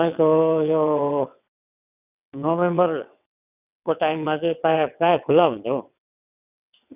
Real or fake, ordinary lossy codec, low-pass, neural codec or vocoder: fake; none; 3.6 kHz; vocoder, 22.05 kHz, 80 mel bands, WaveNeXt